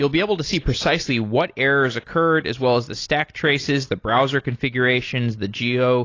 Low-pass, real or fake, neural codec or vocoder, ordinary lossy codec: 7.2 kHz; real; none; AAC, 32 kbps